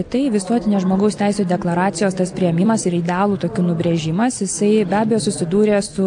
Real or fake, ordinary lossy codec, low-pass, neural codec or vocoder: real; AAC, 64 kbps; 9.9 kHz; none